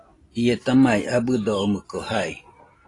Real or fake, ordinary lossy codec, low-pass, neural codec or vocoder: real; AAC, 32 kbps; 10.8 kHz; none